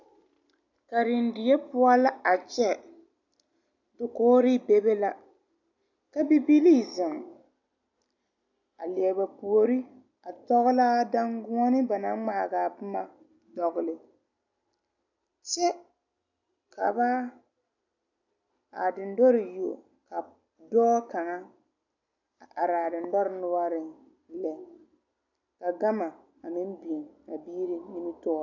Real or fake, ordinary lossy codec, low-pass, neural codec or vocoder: real; AAC, 48 kbps; 7.2 kHz; none